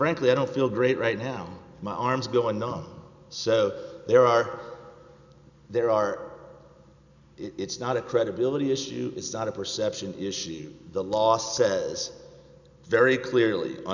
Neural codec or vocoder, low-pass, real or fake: none; 7.2 kHz; real